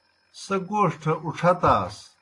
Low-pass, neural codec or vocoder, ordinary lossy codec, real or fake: 10.8 kHz; none; AAC, 48 kbps; real